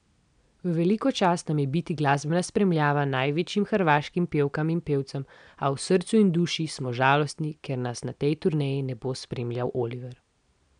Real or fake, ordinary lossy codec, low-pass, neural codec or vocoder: real; none; 9.9 kHz; none